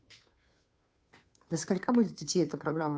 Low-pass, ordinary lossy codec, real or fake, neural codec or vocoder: none; none; fake; codec, 16 kHz, 2 kbps, FunCodec, trained on Chinese and English, 25 frames a second